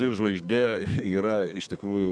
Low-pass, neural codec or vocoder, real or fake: 9.9 kHz; codec, 32 kHz, 1.9 kbps, SNAC; fake